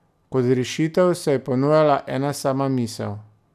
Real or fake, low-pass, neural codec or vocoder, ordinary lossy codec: real; 14.4 kHz; none; none